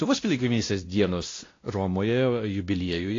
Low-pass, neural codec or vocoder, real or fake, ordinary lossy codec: 7.2 kHz; codec, 16 kHz, 1 kbps, X-Codec, WavLM features, trained on Multilingual LibriSpeech; fake; AAC, 32 kbps